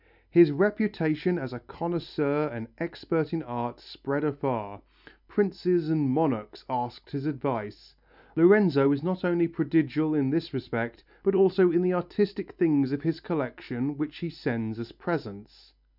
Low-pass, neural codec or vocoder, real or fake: 5.4 kHz; none; real